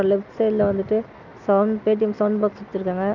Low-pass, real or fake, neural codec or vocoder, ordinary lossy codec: 7.2 kHz; real; none; none